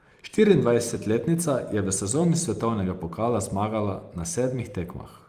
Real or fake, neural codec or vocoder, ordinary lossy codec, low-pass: fake; vocoder, 44.1 kHz, 128 mel bands every 256 samples, BigVGAN v2; Opus, 32 kbps; 14.4 kHz